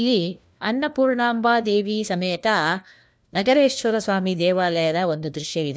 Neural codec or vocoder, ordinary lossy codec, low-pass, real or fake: codec, 16 kHz, 1 kbps, FunCodec, trained on LibriTTS, 50 frames a second; none; none; fake